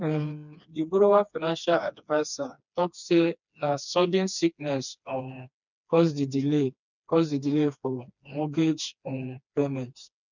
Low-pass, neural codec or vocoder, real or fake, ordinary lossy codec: 7.2 kHz; codec, 16 kHz, 2 kbps, FreqCodec, smaller model; fake; none